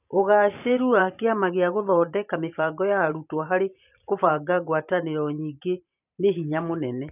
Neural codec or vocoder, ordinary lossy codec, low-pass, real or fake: vocoder, 44.1 kHz, 128 mel bands every 512 samples, BigVGAN v2; none; 3.6 kHz; fake